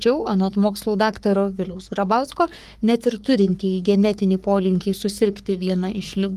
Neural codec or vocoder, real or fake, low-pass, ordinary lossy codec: codec, 44.1 kHz, 3.4 kbps, Pupu-Codec; fake; 14.4 kHz; Opus, 32 kbps